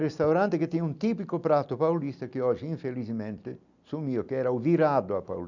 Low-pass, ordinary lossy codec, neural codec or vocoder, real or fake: 7.2 kHz; none; none; real